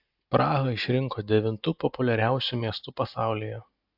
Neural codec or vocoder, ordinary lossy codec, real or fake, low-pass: none; AAC, 48 kbps; real; 5.4 kHz